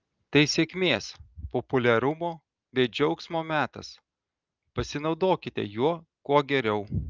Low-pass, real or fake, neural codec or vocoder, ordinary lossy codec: 7.2 kHz; real; none; Opus, 24 kbps